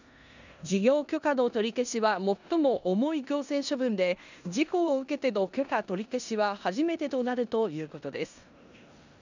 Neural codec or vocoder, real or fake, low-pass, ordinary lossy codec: codec, 16 kHz in and 24 kHz out, 0.9 kbps, LongCat-Audio-Codec, four codebook decoder; fake; 7.2 kHz; none